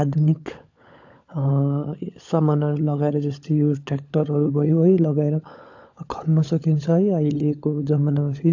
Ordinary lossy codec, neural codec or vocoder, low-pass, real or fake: AAC, 48 kbps; codec, 16 kHz, 16 kbps, FunCodec, trained on LibriTTS, 50 frames a second; 7.2 kHz; fake